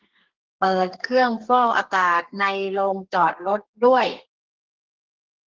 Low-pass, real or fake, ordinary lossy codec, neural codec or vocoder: 7.2 kHz; fake; Opus, 16 kbps; codec, 32 kHz, 1.9 kbps, SNAC